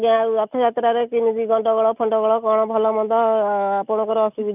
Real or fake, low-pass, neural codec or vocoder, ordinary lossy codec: real; 3.6 kHz; none; none